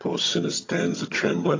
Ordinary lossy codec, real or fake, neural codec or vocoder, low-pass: AAC, 32 kbps; fake; vocoder, 22.05 kHz, 80 mel bands, HiFi-GAN; 7.2 kHz